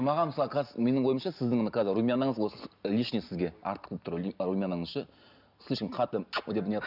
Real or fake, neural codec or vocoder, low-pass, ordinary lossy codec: real; none; 5.4 kHz; none